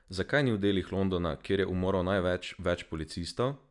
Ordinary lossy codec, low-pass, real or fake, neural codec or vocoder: none; 10.8 kHz; real; none